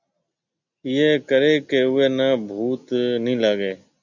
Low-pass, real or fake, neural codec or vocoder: 7.2 kHz; real; none